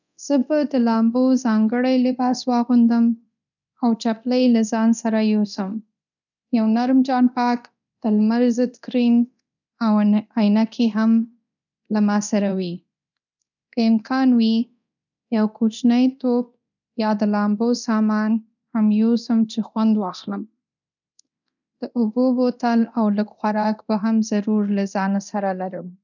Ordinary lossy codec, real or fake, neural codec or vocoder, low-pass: none; fake; codec, 24 kHz, 0.9 kbps, DualCodec; 7.2 kHz